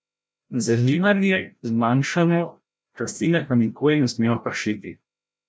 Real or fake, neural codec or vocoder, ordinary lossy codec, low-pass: fake; codec, 16 kHz, 0.5 kbps, FreqCodec, larger model; none; none